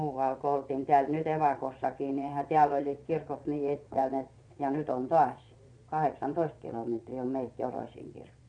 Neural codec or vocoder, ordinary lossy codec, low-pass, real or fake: vocoder, 22.05 kHz, 80 mel bands, Vocos; none; 9.9 kHz; fake